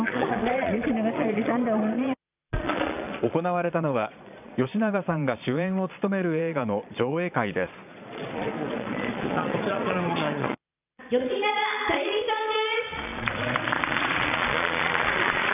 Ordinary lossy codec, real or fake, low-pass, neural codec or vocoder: none; fake; 3.6 kHz; vocoder, 22.05 kHz, 80 mel bands, Vocos